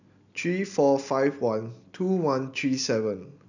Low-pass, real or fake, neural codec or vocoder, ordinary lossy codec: 7.2 kHz; real; none; none